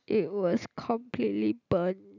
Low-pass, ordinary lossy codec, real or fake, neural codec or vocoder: 7.2 kHz; none; real; none